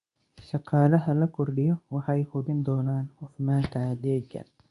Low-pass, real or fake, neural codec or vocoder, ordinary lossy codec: 10.8 kHz; fake; codec, 24 kHz, 0.9 kbps, WavTokenizer, medium speech release version 2; none